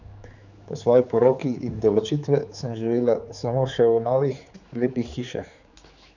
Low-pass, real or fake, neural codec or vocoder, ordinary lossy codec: 7.2 kHz; fake; codec, 16 kHz, 4 kbps, X-Codec, HuBERT features, trained on general audio; none